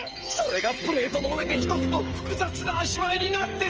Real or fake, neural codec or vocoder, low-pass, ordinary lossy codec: fake; codec, 16 kHz, 8 kbps, FreqCodec, smaller model; 7.2 kHz; Opus, 24 kbps